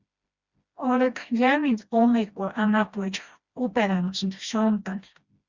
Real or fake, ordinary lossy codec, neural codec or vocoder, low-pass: fake; Opus, 64 kbps; codec, 16 kHz, 1 kbps, FreqCodec, smaller model; 7.2 kHz